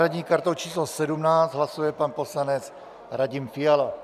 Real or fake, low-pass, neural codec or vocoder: real; 14.4 kHz; none